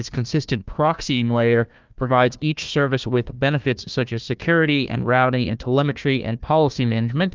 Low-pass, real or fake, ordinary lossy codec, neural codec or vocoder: 7.2 kHz; fake; Opus, 24 kbps; codec, 16 kHz, 1 kbps, FunCodec, trained on Chinese and English, 50 frames a second